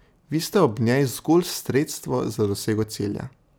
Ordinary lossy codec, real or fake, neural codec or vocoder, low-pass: none; real; none; none